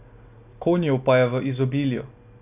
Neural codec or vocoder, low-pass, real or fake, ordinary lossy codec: none; 3.6 kHz; real; none